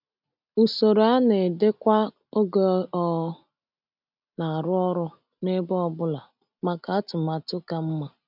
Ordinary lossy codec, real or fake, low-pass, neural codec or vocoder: none; real; 5.4 kHz; none